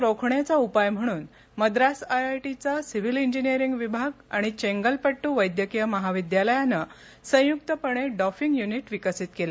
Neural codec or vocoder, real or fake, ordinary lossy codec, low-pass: none; real; none; none